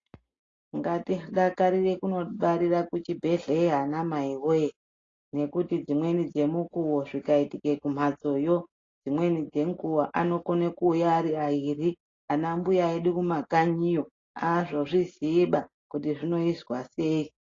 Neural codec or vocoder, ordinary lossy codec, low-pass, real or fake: none; AAC, 32 kbps; 7.2 kHz; real